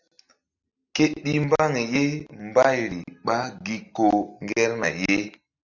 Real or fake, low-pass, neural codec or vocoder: real; 7.2 kHz; none